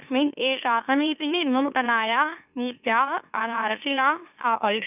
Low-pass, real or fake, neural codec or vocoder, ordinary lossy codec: 3.6 kHz; fake; autoencoder, 44.1 kHz, a latent of 192 numbers a frame, MeloTTS; none